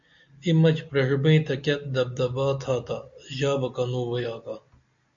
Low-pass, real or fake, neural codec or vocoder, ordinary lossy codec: 7.2 kHz; real; none; MP3, 64 kbps